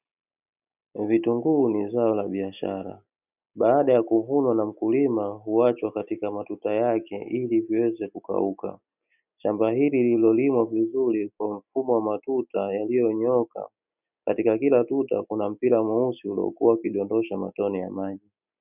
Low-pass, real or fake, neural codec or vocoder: 3.6 kHz; real; none